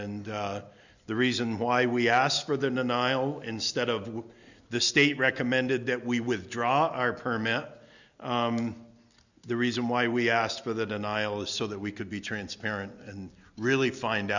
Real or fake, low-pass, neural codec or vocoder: real; 7.2 kHz; none